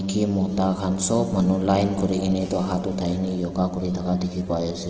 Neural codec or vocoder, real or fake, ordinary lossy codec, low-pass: none; real; Opus, 16 kbps; 7.2 kHz